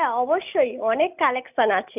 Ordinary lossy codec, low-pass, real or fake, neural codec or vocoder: none; 3.6 kHz; real; none